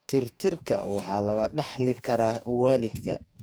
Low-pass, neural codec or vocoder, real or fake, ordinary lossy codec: none; codec, 44.1 kHz, 2.6 kbps, DAC; fake; none